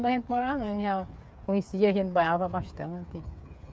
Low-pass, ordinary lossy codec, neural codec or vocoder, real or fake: none; none; codec, 16 kHz, 8 kbps, FreqCodec, smaller model; fake